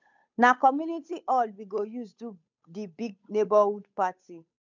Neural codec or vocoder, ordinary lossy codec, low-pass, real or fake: codec, 16 kHz, 8 kbps, FunCodec, trained on Chinese and English, 25 frames a second; none; 7.2 kHz; fake